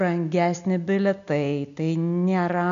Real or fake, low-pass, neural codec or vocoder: real; 7.2 kHz; none